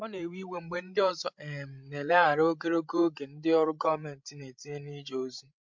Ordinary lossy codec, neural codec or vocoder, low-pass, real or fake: MP3, 64 kbps; codec, 16 kHz, 16 kbps, FreqCodec, larger model; 7.2 kHz; fake